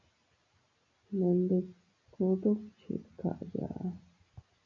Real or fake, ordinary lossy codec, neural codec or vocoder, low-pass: real; MP3, 64 kbps; none; 7.2 kHz